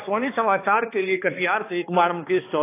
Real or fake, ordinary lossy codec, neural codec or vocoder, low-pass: fake; AAC, 24 kbps; codec, 16 kHz, 2 kbps, X-Codec, HuBERT features, trained on balanced general audio; 3.6 kHz